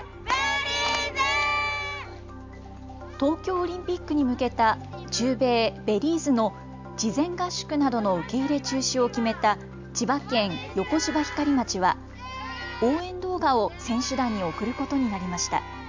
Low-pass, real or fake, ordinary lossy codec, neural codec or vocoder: 7.2 kHz; real; MP3, 64 kbps; none